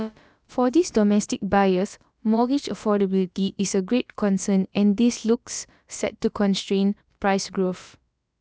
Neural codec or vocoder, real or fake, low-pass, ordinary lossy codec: codec, 16 kHz, about 1 kbps, DyCAST, with the encoder's durations; fake; none; none